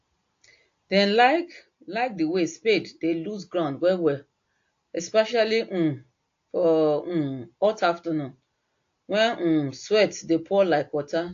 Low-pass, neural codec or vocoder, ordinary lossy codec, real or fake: 7.2 kHz; none; AAC, 48 kbps; real